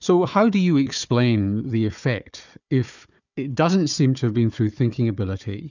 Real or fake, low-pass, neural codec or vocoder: fake; 7.2 kHz; codec, 16 kHz, 4 kbps, FunCodec, trained on Chinese and English, 50 frames a second